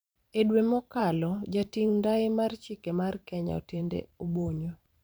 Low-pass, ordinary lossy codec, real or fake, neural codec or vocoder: none; none; fake; vocoder, 44.1 kHz, 128 mel bands every 512 samples, BigVGAN v2